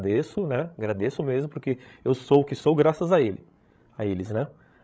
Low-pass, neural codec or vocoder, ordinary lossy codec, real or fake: none; codec, 16 kHz, 16 kbps, FreqCodec, larger model; none; fake